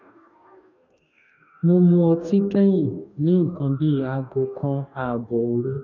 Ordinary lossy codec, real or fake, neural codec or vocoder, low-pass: none; fake; codec, 44.1 kHz, 2.6 kbps, DAC; 7.2 kHz